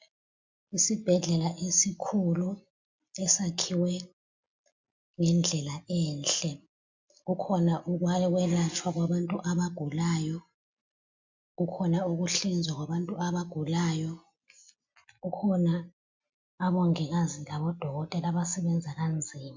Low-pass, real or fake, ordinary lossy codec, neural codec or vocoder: 7.2 kHz; real; MP3, 64 kbps; none